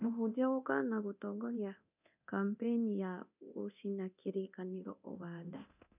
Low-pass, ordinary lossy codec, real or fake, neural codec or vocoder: 3.6 kHz; none; fake; codec, 24 kHz, 0.9 kbps, DualCodec